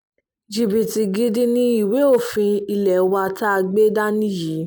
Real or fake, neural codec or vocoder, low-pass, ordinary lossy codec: real; none; none; none